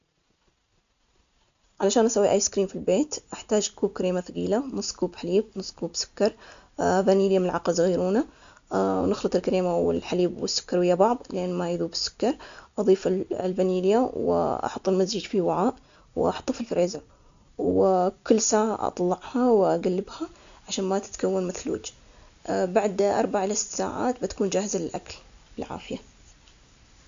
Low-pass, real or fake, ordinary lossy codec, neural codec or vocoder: 7.2 kHz; real; MP3, 64 kbps; none